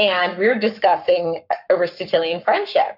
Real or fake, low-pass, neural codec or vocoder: fake; 5.4 kHz; codec, 44.1 kHz, 7.8 kbps, Pupu-Codec